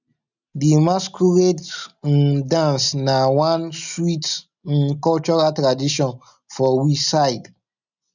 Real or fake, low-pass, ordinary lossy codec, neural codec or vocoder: real; 7.2 kHz; none; none